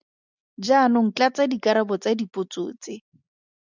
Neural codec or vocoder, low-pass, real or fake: none; 7.2 kHz; real